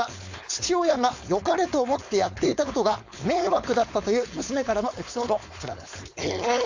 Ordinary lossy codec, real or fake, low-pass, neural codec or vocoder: AAC, 48 kbps; fake; 7.2 kHz; codec, 16 kHz, 4.8 kbps, FACodec